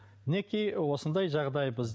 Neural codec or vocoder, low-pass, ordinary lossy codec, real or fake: none; none; none; real